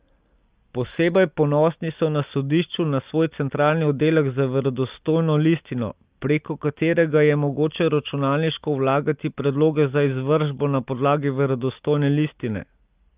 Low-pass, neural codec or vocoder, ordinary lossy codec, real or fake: 3.6 kHz; none; Opus, 32 kbps; real